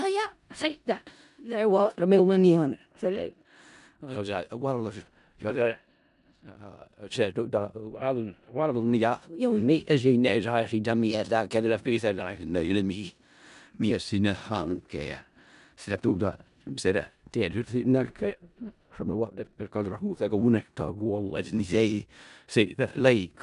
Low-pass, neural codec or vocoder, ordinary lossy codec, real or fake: 10.8 kHz; codec, 16 kHz in and 24 kHz out, 0.4 kbps, LongCat-Audio-Codec, four codebook decoder; none; fake